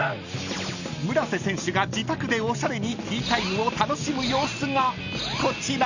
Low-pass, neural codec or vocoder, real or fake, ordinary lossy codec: 7.2 kHz; none; real; none